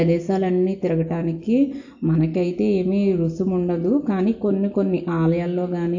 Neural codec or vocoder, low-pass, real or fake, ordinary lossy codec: none; 7.2 kHz; real; AAC, 48 kbps